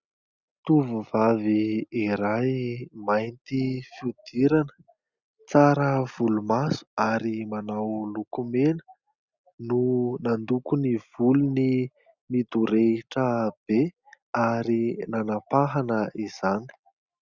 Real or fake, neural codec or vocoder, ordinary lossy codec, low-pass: real; none; Opus, 64 kbps; 7.2 kHz